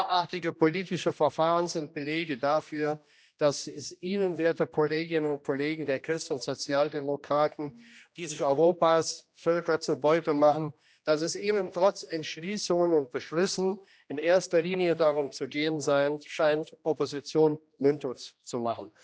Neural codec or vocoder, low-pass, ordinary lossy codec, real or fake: codec, 16 kHz, 1 kbps, X-Codec, HuBERT features, trained on general audio; none; none; fake